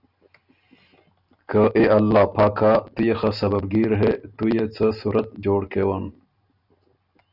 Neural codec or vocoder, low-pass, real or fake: none; 5.4 kHz; real